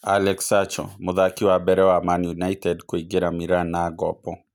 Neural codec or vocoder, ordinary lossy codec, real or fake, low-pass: none; none; real; 19.8 kHz